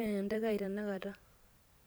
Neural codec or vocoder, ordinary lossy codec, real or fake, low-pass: vocoder, 44.1 kHz, 128 mel bands every 512 samples, BigVGAN v2; none; fake; none